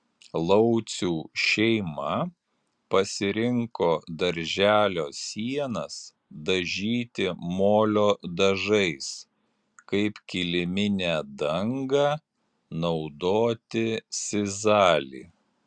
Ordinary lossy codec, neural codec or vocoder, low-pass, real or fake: Opus, 64 kbps; none; 9.9 kHz; real